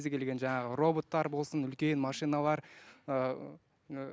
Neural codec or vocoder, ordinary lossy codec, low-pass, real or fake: none; none; none; real